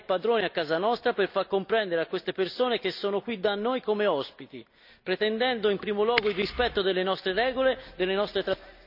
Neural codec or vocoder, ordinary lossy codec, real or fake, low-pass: none; none; real; 5.4 kHz